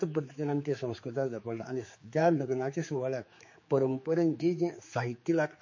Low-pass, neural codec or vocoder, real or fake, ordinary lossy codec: 7.2 kHz; codec, 16 kHz, 4 kbps, X-Codec, HuBERT features, trained on general audio; fake; MP3, 32 kbps